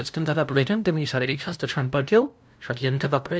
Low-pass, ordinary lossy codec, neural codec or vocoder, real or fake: none; none; codec, 16 kHz, 0.5 kbps, FunCodec, trained on LibriTTS, 25 frames a second; fake